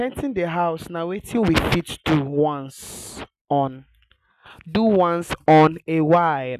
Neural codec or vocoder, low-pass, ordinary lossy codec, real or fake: none; 14.4 kHz; none; real